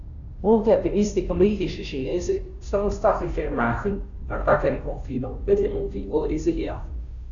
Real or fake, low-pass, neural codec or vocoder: fake; 7.2 kHz; codec, 16 kHz, 0.5 kbps, FunCodec, trained on Chinese and English, 25 frames a second